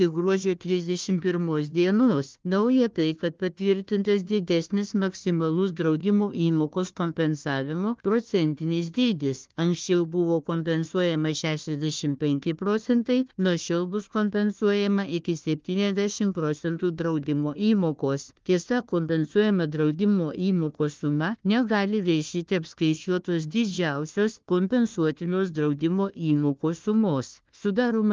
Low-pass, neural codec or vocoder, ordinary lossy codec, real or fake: 7.2 kHz; codec, 16 kHz, 1 kbps, FunCodec, trained on Chinese and English, 50 frames a second; Opus, 32 kbps; fake